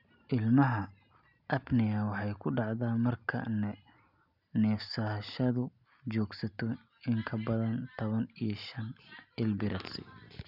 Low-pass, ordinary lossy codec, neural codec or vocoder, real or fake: 5.4 kHz; none; none; real